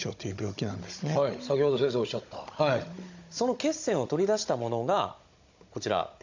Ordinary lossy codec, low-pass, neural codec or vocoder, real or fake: MP3, 64 kbps; 7.2 kHz; codec, 16 kHz, 16 kbps, FunCodec, trained on Chinese and English, 50 frames a second; fake